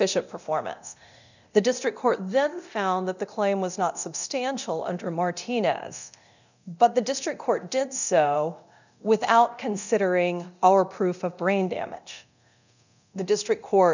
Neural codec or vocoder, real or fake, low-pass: codec, 24 kHz, 0.9 kbps, DualCodec; fake; 7.2 kHz